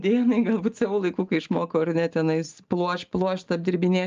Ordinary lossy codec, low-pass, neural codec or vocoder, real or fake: Opus, 32 kbps; 7.2 kHz; none; real